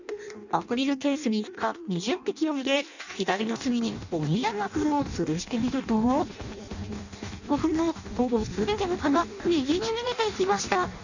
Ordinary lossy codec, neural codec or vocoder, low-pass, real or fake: none; codec, 16 kHz in and 24 kHz out, 0.6 kbps, FireRedTTS-2 codec; 7.2 kHz; fake